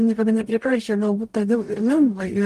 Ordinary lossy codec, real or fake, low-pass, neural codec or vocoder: Opus, 32 kbps; fake; 14.4 kHz; codec, 44.1 kHz, 0.9 kbps, DAC